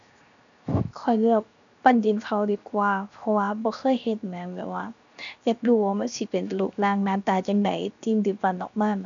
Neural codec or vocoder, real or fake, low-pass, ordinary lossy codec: codec, 16 kHz, 0.7 kbps, FocalCodec; fake; 7.2 kHz; none